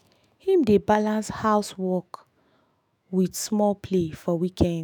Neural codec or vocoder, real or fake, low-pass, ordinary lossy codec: autoencoder, 48 kHz, 128 numbers a frame, DAC-VAE, trained on Japanese speech; fake; none; none